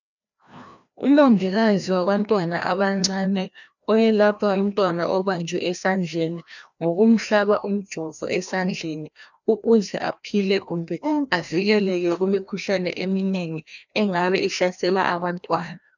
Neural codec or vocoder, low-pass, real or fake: codec, 16 kHz, 1 kbps, FreqCodec, larger model; 7.2 kHz; fake